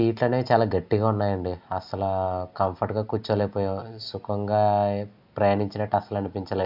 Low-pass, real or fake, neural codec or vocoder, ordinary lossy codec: 5.4 kHz; real; none; AAC, 48 kbps